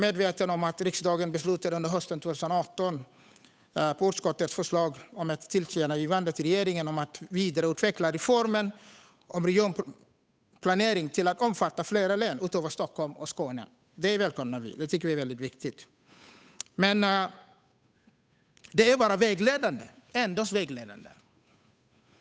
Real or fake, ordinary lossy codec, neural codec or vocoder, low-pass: fake; none; codec, 16 kHz, 8 kbps, FunCodec, trained on Chinese and English, 25 frames a second; none